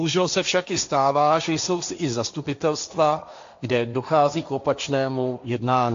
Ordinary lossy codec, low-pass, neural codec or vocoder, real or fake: AAC, 64 kbps; 7.2 kHz; codec, 16 kHz, 1.1 kbps, Voila-Tokenizer; fake